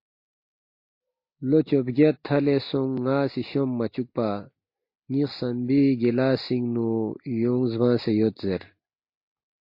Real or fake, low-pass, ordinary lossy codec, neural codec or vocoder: real; 5.4 kHz; MP3, 32 kbps; none